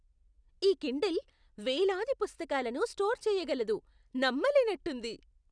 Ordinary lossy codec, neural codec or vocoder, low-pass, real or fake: none; none; 9.9 kHz; real